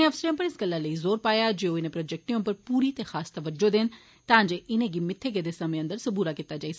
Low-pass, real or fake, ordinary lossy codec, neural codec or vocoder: none; real; none; none